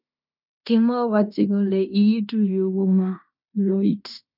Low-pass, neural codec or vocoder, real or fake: 5.4 kHz; codec, 16 kHz in and 24 kHz out, 0.9 kbps, LongCat-Audio-Codec, fine tuned four codebook decoder; fake